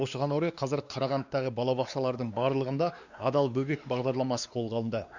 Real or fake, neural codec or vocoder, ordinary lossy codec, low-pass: fake; codec, 16 kHz, 2 kbps, X-Codec, WavLM features, trained on Multilingual LibriSpeech; Opus, 64 kbps; 7.2 kHz